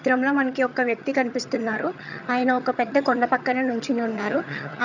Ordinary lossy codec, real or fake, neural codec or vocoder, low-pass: none; fake; vocoder, 22.05 kHz, 80 mel bands, HiFi-GAN; 7.2 kHz